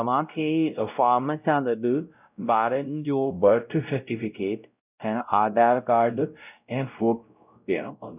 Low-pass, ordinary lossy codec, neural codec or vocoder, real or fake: 3.6 kHz; none; codec, 16 kHz, 0.5 kbps, X-Codec, WavLM features, trained on Multilingual LibriSpeech; fake